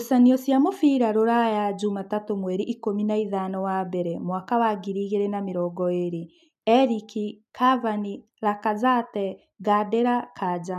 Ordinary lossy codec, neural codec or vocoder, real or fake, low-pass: none; none; real; 14.4 kHz